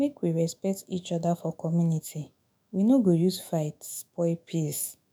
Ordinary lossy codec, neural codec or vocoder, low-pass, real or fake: none; autoencoder, 48 kHz, 128 numbers a frame, DAC-VAE, trained on Japanese speech; none; fake